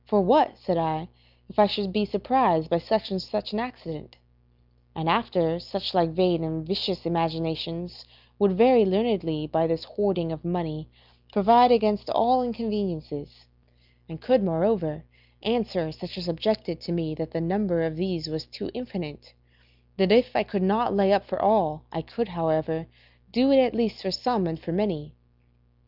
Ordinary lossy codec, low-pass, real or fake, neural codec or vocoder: Opus, 24 kbps; 5.4 kHz; real; none